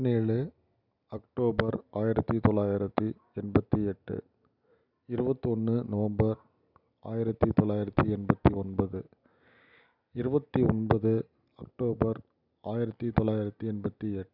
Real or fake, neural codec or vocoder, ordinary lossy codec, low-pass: real; none; none; 5.4 kHz